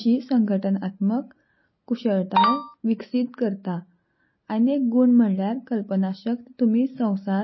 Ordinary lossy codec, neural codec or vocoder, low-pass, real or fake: MP3, 24 kbps; none; 7.2 kHz; real